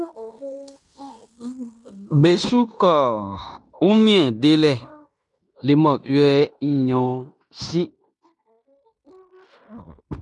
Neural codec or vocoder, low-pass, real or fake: codec, 16 kHz in and 24 kHz out, 0.9 kbps, LongCat-Audio-Codec, fine tuned four codebook decoder; 10.8 kHz; fake